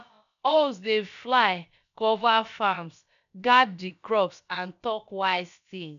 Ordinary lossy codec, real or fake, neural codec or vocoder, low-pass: none; fake; codec, 16 kHz, about 1 kbps, DyCAST, with the encoder's durations; 7.2 kHz